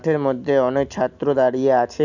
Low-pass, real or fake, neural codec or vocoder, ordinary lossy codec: 7.2 kHz; real; none; none